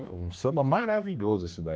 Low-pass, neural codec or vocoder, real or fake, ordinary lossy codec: none; codec, 16 kHz, 2 kbps, X-Codec, HuBERT features, trained on general audio; fake; none